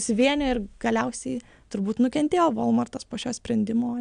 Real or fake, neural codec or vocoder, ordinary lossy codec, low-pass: real; none; AAC, 96 kbps; 9.9 kHz